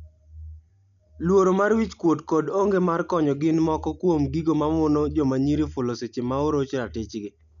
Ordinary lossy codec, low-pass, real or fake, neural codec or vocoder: MP3, 96 kbps; 7.2 kHz; real; none